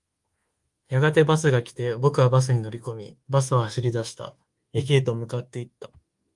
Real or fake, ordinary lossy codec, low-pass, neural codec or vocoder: fake; Opus, 24 kbps; 10.8 kHz; codec, 24 kHz, 1.2 kbps, DualCodec